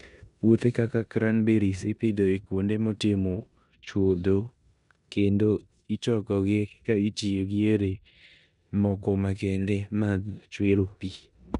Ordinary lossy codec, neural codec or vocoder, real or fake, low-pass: none; codec, 16 kHz in and 24 kHz out, 0.9 kbps, LongCat-Audio-Codec, four codebook decoder; fake; 10.8 kHz